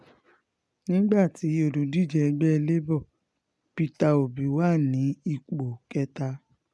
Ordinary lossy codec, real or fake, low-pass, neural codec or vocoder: none; real; none; none